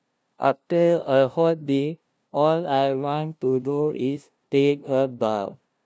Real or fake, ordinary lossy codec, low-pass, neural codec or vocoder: fake; none; none; codec, 16 kHz, 0.5 kbps, FunCodec, trained on LibriTTS, 25 frames a second